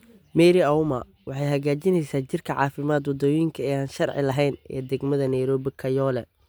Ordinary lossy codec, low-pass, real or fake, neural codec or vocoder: none; none; real; none